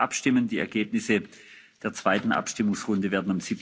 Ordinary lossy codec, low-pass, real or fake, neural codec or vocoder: none; none; real; none